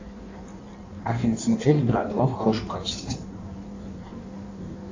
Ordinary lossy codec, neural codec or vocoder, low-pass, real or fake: AAC, 48 kbps; codec, 16 kHz in and 24 kHz out, 1.1 kbps, FireRedTTS-2 codec; 7.2 kHz; fake